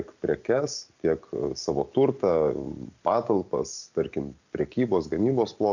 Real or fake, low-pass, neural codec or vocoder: real; 7.2 kHz; none